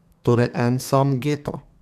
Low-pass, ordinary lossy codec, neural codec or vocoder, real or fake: 14.4 kHz; none; codec, 32 kHz, 1.9 kbps, SNAC; fake